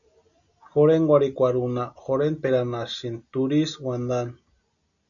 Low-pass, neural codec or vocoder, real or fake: 7.2 kHz; none; real